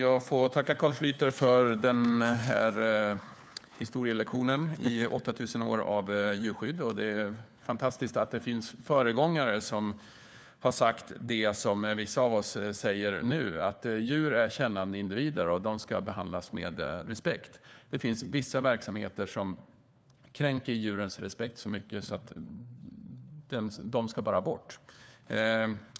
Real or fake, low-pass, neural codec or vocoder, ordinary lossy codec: fake; none; codec, 16 kHz, 4 kbps, FunCodec, trained on LibriTTS, 50 frames a second; none